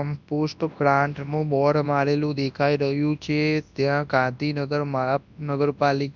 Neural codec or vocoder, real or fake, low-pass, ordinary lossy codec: codec, 24 kHz, 0.9 kbps, WavTokenizer, large speech release; fake; 7.2 kHz; none